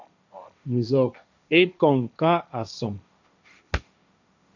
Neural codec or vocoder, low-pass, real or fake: codec, 16 kHz, 1.1 kbps, Voila-Tokenizer; 7.2 kHz; fake